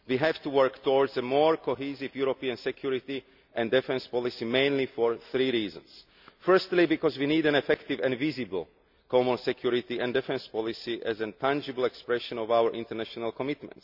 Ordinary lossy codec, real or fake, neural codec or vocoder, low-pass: none; real; none; 5.4 kHz